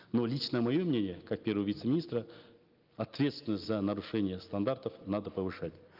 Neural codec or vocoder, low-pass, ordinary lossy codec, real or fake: none; 5.4 kHz; Opus, 24 kbps; real